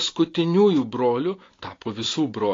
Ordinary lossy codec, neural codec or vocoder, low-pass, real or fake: AAC, 32 kbps; none; 7.2 kHz; real